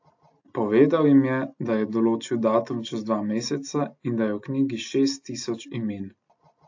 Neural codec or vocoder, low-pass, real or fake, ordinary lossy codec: none; 7.2 kHz; real; AAC, 48 kbps